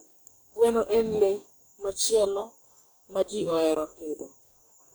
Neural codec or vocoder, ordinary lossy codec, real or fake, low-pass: codec, 44.1 kHz, 2.6 kbps, DAC; none; fake; none